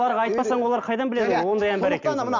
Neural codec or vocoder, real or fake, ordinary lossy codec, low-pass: none; real; none; 7.2 kHz